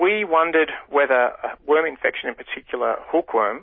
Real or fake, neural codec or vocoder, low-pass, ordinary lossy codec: real; none; 7.2 kHz; MP3, 24 kbps